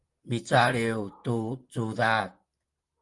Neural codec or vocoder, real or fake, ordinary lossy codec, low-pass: vocoder, 44.1 kHz, 128 mel bands, Pupu-Vocoder; fake; Opus, 32 kbps; 10.8 kHz